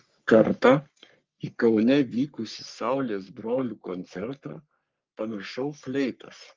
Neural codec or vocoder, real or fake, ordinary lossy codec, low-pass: codec, 44.1 kHz, 3.4 kbps, Pupu-Codec; fake; Opus, 24 kbps; 7.2 kHz